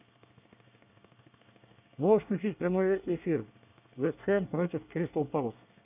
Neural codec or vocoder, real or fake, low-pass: codec, 24 kHz, 1 kbps, SNAC; fake; 3.6 kHz